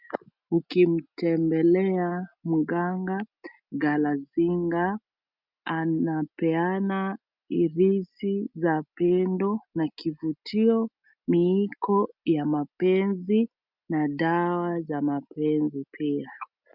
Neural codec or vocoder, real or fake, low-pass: none; real; 5.4 kHz